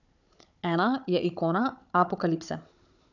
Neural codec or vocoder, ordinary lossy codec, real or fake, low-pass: codec, 16 kHz, 16 kbps, FunCodec, trained on Chinese and English, 50 frames a second; none; fake; 7.2 kHz